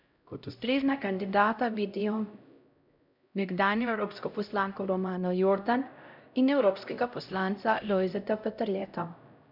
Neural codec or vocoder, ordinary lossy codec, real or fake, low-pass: codec, 16 kHz, 0.5 kbps, X-Codec, HuBERT features, trained on LibriSpeech; none; fake; 5.4 kHz